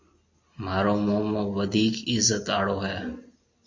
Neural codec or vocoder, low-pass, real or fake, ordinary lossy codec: none; 7.2 kHz; real; MP3, 48 kbps